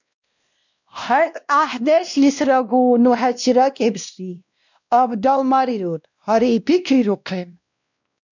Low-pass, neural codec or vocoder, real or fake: 7.2 kHz; codec, 16 kHz, 1 kbps, X-Codec, WavLM features, trained on Multilingual LibriSpeech; fake